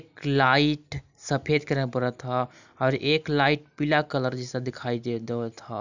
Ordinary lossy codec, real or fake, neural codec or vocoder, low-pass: none; real; none; 7.2 kHz